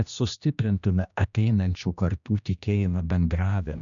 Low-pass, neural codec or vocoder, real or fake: 7.2 kHz; codec, 16 kHz, 1 kbps, X-Codec, HuBERT features, trained on general audio; fake